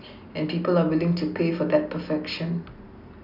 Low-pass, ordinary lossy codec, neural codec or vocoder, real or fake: 5.4 kHz; none; none; real